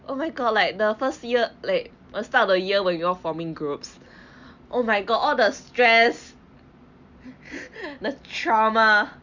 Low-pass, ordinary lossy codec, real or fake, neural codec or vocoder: 7.2 kHz; none; real; none